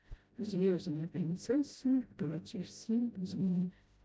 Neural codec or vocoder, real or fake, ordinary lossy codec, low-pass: codec, 16 kHz, 0.5 kbps, FreqCodec, smaller model; fake; none; none